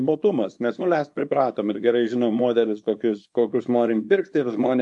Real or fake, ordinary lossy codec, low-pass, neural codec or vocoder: fake; MP3, 64 kbps; 10.8 kHz; codec, 24 kHz, 0.9 kbps, WavTokenizer, small release